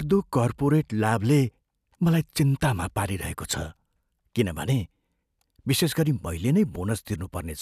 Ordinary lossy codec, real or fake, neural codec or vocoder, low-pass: none; real; none; 14.4 kHz